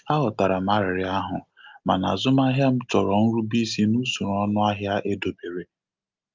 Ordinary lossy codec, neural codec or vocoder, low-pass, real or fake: Opus, 24 kbps; none; 7.2 kHz; real